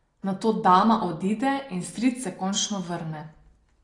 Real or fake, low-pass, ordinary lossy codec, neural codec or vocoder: real; 10.8 kHz; AAC, 32 kbps; none